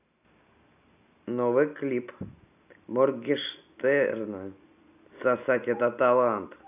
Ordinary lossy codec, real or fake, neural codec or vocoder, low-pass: none; real; none; 3.6 kHz